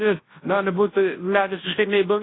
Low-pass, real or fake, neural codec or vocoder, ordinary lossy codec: 7.2 kHz; fake; codec, 24 kHz, 0.9 kbps, WavTokenizer, large speech release; AAC, 16 kbps